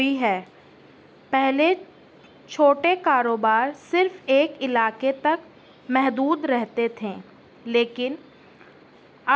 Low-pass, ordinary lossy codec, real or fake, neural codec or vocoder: none; none; real; none